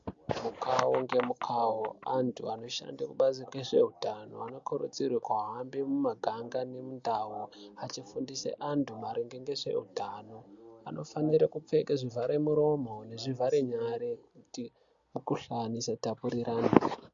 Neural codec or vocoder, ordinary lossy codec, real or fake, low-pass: none; AAC, 64 kbps; real; 7.2 kHz